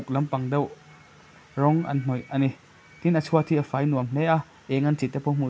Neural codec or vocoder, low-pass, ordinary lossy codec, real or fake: none; none; none; real